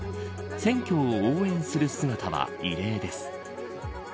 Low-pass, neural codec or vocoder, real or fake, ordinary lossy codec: none; none; real; none